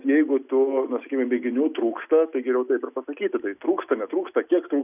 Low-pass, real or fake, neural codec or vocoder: 3.6 kHz; real; none